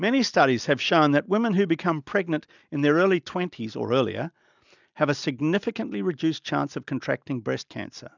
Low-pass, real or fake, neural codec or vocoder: 7.2 kHz; real; none